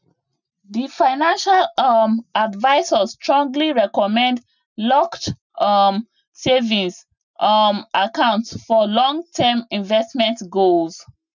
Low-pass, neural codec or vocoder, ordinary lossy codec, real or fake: 7.2 kHz; none; none; real